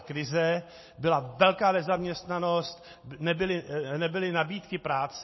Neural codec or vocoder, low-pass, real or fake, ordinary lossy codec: none; 7.2 kHz; real; MP3, 24 kbps